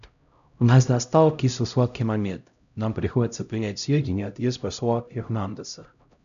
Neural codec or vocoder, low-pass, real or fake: codec, 16 kHz, 0.5 kbps, X-Codec, HuBERT features, trained on LibriSpeech; 7.2 kHz; fake